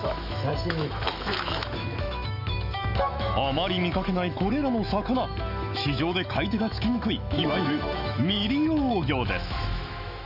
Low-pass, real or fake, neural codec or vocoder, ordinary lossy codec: 5.4 kHz; fake; autoencoder, 48 kHz, 128 numbers a frame, DAC-VAE, trained on Japanese speech; none